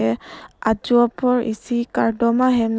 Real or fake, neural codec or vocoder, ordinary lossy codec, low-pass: real; none; none; none